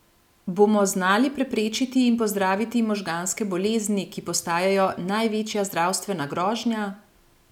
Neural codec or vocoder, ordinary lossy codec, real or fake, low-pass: none; none; real; 19.8 kHz